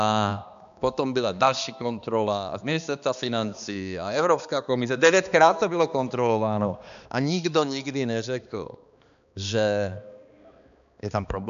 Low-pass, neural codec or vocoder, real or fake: 7.2 kHz; codec, 16 kHz, 2 kbps, X-Codec, HuBERT features, trained on balanced general audio; fake